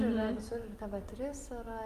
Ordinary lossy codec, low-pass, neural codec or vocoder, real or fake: Opus, 32 kbps; 14.4 kHz; none; real